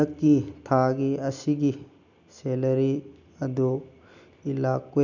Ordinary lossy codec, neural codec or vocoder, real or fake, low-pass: none; none; real; 7.2 kHz